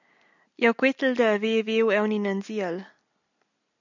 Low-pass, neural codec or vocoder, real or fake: 7.2 kHz; none; real